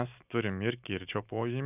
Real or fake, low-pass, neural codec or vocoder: real; 3.6 kHz; none